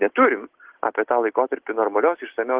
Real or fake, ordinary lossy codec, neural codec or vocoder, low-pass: real; Opus, 16 kbps; none; 3.6 kHz